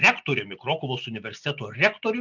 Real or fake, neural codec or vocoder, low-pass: real; none; 7.2 kHz